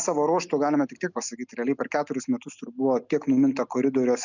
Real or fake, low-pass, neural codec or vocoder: real; 7.2 kHz; none